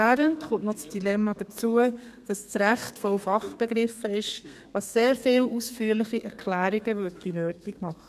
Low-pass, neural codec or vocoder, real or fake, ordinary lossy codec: 14.4 kHz; codec, 32 kHz, 1.9 kbps, SNAC; fake; none